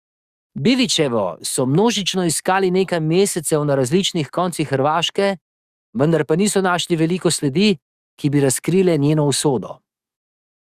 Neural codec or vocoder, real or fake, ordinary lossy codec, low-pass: codec, 44.1 kHz, 7.8 kbps, DAC; fake; Opus, 64 kbps; 14.4 kHz